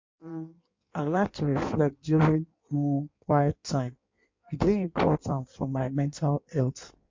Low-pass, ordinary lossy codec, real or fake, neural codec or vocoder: 7.2 kHz; MP3, 48 kbps; fake; codec, 16 kHz in and 24 kHz out, 1.1 kbps, FireRedTTS-2 codec